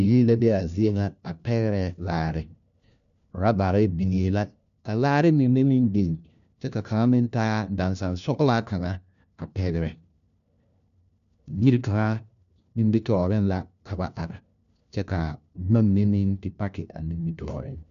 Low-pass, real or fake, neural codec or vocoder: 7.2 kHz; fake; codec, 16 kHz, 1 kbps, FunCodec, trained on LibriTTS, 50 frames a second